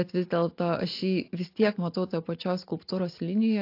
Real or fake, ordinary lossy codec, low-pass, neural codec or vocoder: real; AAC, 32 kbps; 5.4 kHz; none